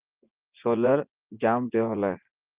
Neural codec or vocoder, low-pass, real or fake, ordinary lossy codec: vocoder, 24 kHz, 100 mel bands, Vocos; 3.6 kHz; fake; Opus, 32 kbps